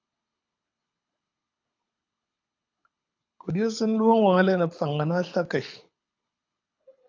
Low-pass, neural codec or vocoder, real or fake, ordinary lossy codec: 7.2 kHz; codec, 24 kHz, 6 kbps, HILCodec; fake; AAC, 48 kbps